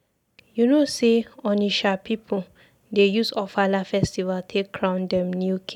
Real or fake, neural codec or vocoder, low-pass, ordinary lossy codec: real; none; 19.8 kHz; none